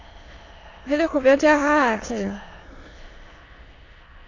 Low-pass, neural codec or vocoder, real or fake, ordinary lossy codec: 7.2 kHz; autoencoder, 22.05 kHz, a latent of 192 numbers a frame, VITS, trained on many speakers; fake; AAC, 32 kbps